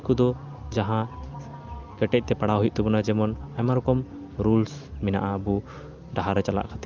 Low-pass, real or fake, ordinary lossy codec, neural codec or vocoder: 7.2 kHz; real; Opus, 24 kbps; none